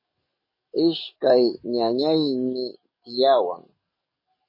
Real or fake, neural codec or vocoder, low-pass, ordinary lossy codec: fake; codec, 44.1 kHz, 7.8 kbps, DAC; 5.4 kHz; MP3, 24 kbps